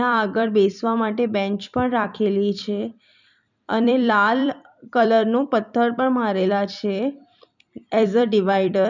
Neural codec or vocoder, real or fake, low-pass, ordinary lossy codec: vocoder, 44.1 kHz, 128 mel bands every 256 samples, BigVGAN v2; fake; 7.2 kHz; none